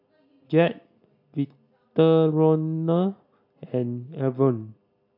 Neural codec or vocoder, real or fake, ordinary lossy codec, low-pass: none; real; MP3, 48 kbps; 5.4 kHz